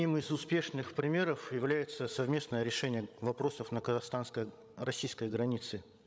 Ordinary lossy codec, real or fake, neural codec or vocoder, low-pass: none; fake; codec, 16 kHz, 16 kbps, FreqCodec, larger model; none